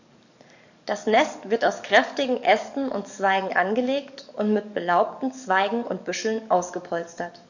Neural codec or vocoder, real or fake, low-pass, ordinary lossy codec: codec, 44.1 kHz, 7.8 kbps, DAC; fake; 7.2 kHz; none